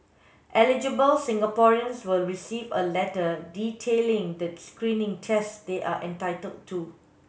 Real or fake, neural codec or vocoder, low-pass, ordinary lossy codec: real; none; none; none